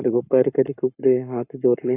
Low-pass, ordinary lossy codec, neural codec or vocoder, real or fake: 3.6 kHz; AAC, 24 kbps; codec, 44.1 kHz, 7.8 kbps, Pupu-Codec; fake